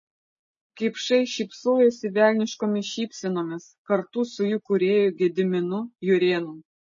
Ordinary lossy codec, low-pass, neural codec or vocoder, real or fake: MP3, 32 kbps; 7.2 kHz; none; real